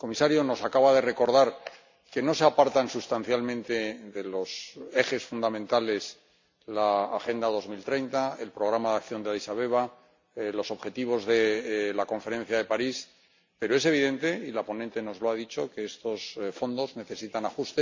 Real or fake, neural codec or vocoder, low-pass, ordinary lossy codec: real; none; 7.2 kHz; MP3, 64 kbps